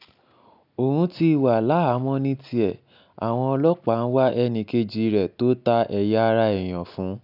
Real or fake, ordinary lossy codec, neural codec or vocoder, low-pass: real; none; none; 5.4 kHz